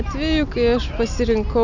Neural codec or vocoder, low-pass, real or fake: none; 7.2 kHz; real